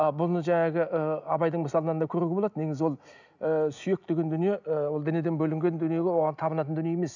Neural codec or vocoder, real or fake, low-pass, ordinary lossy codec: none; real; 7.2 kHz; none